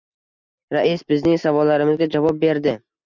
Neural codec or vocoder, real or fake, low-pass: none; real; 7.2 kHz